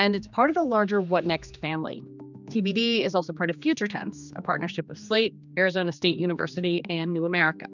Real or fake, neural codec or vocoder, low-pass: fake; codec, 16 kHz, 2 kbps, X-Codec, HuBERT features, trained on general audio; 7.2 kHz